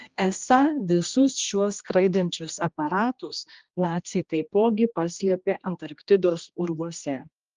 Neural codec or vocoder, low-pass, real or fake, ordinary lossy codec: codec, 16 kHz, 1 kbps, X-Codec, HuBERT features, trained on general audio; 7.2 kHz; fake; Opus, 32 kbps